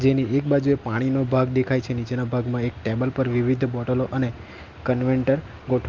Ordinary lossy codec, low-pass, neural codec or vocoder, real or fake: Opus, 24 kbps; 7.2 kHz; none; real